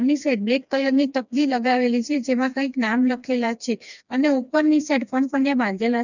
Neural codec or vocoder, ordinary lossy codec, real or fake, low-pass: codec, 16 kHz, 2 kbps, FreqCodec, smaller model; none; fake; 7.2 kHz